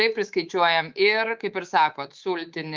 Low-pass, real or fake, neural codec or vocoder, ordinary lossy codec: 7.2 kHz; fake; codec, 24 kHz, 3.1 kbps, DualCodec; Opus, 32 kbps